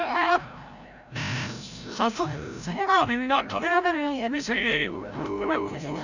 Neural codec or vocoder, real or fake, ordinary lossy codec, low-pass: codec, 16 kHz, 0.5 kbps, FreqCodec, larger model; fake; none; 7.2 kHz